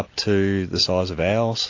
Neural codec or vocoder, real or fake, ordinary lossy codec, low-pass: none; real; AAC, 48 kbps; 7.2 kHz